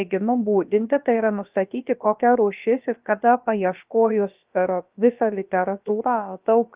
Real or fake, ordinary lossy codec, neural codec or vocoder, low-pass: fake; Opus, 32 kbps; codec, 16 kHz, about 1 kbps, DyCAST, with the encoder's durations; 3.6 kHz